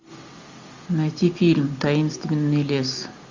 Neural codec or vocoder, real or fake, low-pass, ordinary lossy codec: none; real; 7.2 kHz; MP3, 64 kbps